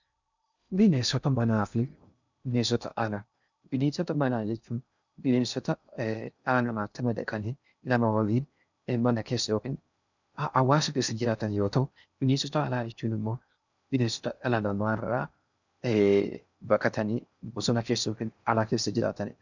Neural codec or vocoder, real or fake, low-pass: codec, 16 kHz in and 24 kHz out, 0.6 kbps, FocalCodec, streaming, 2048 codes; fake; 7.2 kHz